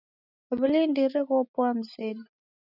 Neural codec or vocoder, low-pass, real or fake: none; 5.4 kHz; real